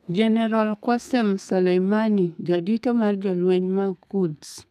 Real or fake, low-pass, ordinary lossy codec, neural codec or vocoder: fake; 14.4 kHz; none; codec, 32 kHz, 1.9 kbps, SNAC